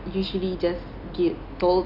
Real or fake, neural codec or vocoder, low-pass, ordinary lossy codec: real; none; 5.4 kHz; none